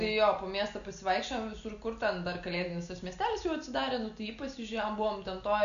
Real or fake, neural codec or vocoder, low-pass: real; none; 7.2 kHz